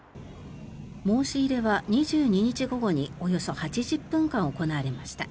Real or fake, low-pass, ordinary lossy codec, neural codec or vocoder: real; none; none; none